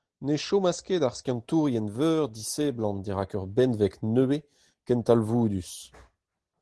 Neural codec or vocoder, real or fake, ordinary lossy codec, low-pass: none; real; Opus, 16 kbps; 10.8 kHz